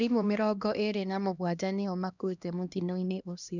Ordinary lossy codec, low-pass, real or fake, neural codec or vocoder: none; 7.2 kHz; fake; codec, 16 kHz, 1 kbps, X-Codec, HuBERT features, trained on LibriSpeech